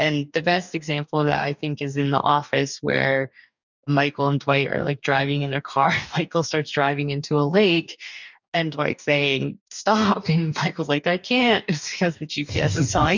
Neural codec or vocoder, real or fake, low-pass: codec, 44.1 kHz, 2.6 kbps, DAC; fake; 7.2 kHz